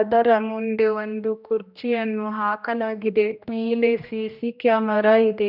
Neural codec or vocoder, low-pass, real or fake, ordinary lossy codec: codec, 16 kHz, 1 kbps, X-Codec, HuBERT features, trained on general audio; 5.4 kHz; fake; none